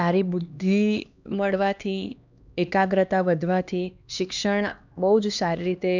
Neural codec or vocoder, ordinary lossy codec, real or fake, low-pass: codec, 16 kHz, 2 kbps, X-Codec, HuBERT features, trained on LibriSpeech; none; fake; 7.2 kHz